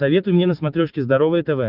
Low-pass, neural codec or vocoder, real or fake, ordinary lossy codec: 5.4 kHz; none; real; Opus, 32 kbps